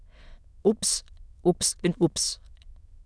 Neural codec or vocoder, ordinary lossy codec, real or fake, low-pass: autoencoder, 22.05 kHz, a latent of 192 numbers a frame, VITS, trained on many speakers; none; fake; none